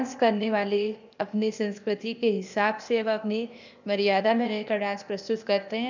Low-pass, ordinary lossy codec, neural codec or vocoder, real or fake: 7.2 kHz; none; codec, 16 kHz, 0.8 kbps, ZipCodec; fake